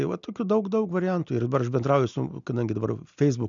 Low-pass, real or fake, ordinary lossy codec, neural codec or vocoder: 7.2 kHz; real; MP3, 96 kbps; none